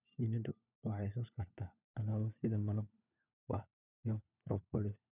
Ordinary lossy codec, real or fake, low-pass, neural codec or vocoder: none; fake; 3.6 kHz; codec, 16 kHz, 4 kbps, FunCodec, trained on LibriTTS, 50 frames a second